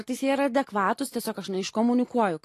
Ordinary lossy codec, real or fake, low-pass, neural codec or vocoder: AAC, 48 kbps; real; 14.4 kHz; none